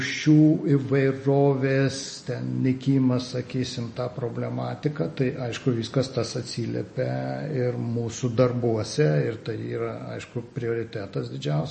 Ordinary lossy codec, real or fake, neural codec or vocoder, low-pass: MP3, 32 kbps; real; none; 10.8 kHz